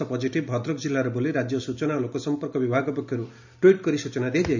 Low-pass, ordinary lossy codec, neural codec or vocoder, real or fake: 7.2 kHz; none; none; real